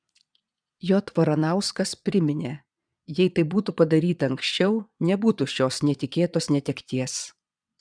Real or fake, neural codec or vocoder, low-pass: fake; vocoder, 22.05 kHz, 80 mel bands, Vocos; 9.9 kHz